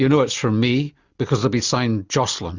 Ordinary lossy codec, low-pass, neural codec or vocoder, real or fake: Opus, 64 kbps; 7.2 kHz; none; real